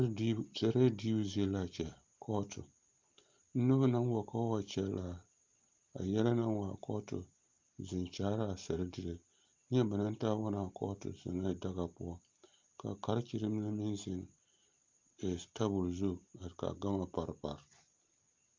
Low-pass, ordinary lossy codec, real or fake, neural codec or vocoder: 7.2 kHz; Opus, 24 kbps; real; none